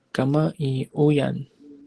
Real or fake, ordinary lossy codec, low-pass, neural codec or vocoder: real; Opus, 16 kbps; 10.8 kHz; none